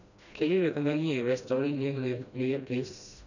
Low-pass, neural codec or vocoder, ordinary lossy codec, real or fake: 7.2 kHz; codec, 16 kHz, 1 kbps, FreqCodec, smaller model; none; fake